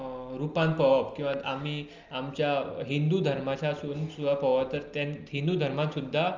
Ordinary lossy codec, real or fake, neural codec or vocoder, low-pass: Opus, 24 kbps; real; none; 7.2 kHz